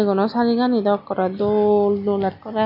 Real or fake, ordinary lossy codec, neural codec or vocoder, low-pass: real; none; none; 5.4 kHz